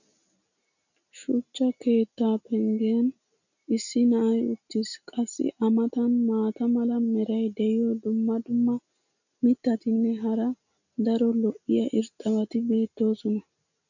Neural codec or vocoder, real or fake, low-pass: none; real; 7.2 kHz